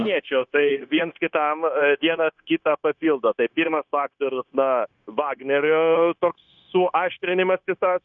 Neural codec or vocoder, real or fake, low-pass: codec, 16 kHz, 0.9 kbps, LongCat-Audio-Codec; fake; 7.2 kHz